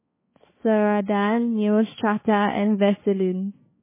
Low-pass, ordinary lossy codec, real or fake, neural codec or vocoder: 3.6 kHz; MP3, 16 kbps; fake; codec, 16 kHz, 4 kbps, X-Codec, HuBERT features, trained on balanced general audio